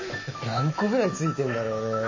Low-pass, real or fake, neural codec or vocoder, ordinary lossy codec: 7.2 kHz; fake; autoencoder, 48 kHz, 128 numbers a frame, DAC-VAE, trained on Japanese speech; MP3, 32 kbps